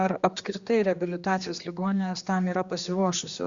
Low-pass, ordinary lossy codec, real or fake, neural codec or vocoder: 7.2 kHz; Opus, 64 kbps; fake; codec, 16 kHz, 2 kbps, X-Codec, HuBERT features, trained on general audio